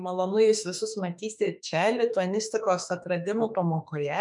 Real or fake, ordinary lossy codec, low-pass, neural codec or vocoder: fake; MP3, 96 kbps; 10.8 kHz; autoencoder, 48 kHz, 32 numbers a frame, DAC-VAE, trained on Japanese speech